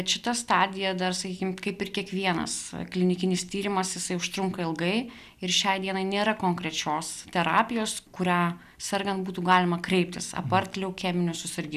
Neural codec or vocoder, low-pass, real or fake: none; 14.4 kHz; real